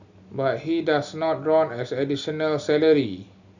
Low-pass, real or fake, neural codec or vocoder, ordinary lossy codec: 7.2 kHz; real; none; none